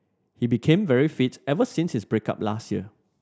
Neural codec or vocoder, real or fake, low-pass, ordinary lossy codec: none; real; none; none